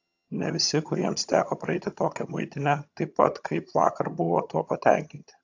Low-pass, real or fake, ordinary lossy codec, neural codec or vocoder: 7.2 kHz; fake; AAC, 48 kbps; vocoder, 22.05 kHz, 80 mel bands, HiFi-GAN